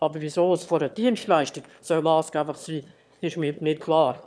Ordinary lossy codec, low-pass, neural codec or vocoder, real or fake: none; none; autoencoder, 22.05 kHz, a latent of 192 numbers a frame, VITS, trained on one speaker; fake